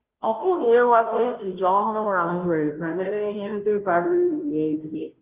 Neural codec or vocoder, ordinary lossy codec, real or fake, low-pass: codec, 16 kHz, 0.5 kbps, FunCodec, trained on Chinese and English, 25 frames a second; Opus, 32 kbps; fake; 3.6 kHz